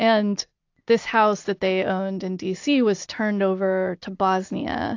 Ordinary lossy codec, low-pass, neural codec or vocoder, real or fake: AAC, 48 kbps; 7.2 kHz; none; real